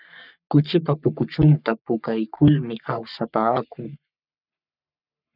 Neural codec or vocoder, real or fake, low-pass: codec, 44.1 kHz, 3.4 kbps, Pupu-Codec; fake; 5.4 kHz